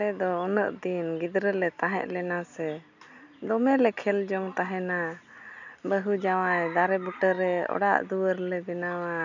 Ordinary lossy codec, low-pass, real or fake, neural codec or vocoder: none; 7.2 kHz; real; none